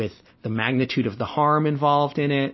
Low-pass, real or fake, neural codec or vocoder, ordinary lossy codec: 7.2 kHz; real; none; MP3, 24 kbps